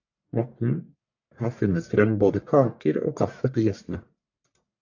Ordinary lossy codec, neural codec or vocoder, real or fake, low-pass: AAC, 48 kbps; codec, 44.1 kHz, 1.7 kbps, Pupu-Codec; fake; 7.2 kHz